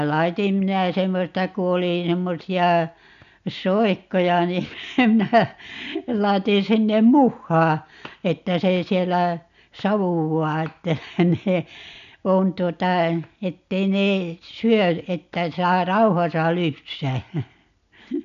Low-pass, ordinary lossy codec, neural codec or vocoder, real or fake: 7.2 kHz; none; none; real